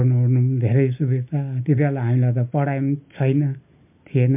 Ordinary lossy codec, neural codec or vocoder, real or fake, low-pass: MP3, 32 kbps; none; real; 3.6 kHz